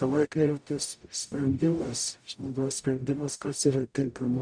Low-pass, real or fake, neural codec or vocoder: 9.9 kHz; fake; codec, 44.1 kHz, 0.9 kbps, DAC